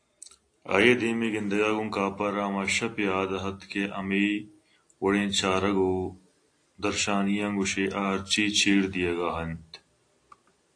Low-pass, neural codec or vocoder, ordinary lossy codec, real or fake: 9.9 kHz; none; AAC, 48 kbps; real